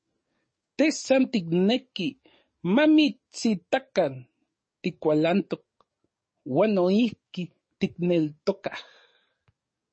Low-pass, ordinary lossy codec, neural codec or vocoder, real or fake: 9.9 kHz; MP3, 32 kbps; codec, 44.1 kHz, 7.8 kbps, DAC; fake